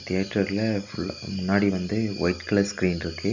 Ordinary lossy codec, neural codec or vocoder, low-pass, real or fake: none; none; 7.2 kHz; real